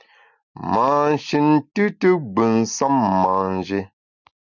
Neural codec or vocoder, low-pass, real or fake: none; 7.2 kHz; real